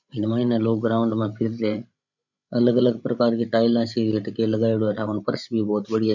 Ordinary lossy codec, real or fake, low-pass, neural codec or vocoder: none; fake; 7.2 kHz; codec, 16 kHz, 16 kbps, FreqCodec, larger model